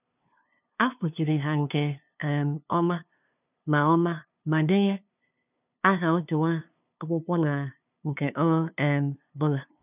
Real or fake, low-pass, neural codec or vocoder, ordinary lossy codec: fake; 3.6 kHz; codec, 16 kHz, 2 kbps, FunCodec, trained on LibriTTS, 25 frames a second; none